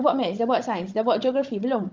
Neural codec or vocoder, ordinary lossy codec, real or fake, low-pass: codec, 16 kHz, 16 kbps, FunCodec, trained on Chinese and English, 50 frames a second; Opus, 24 kbps; fake; 7.2 kHz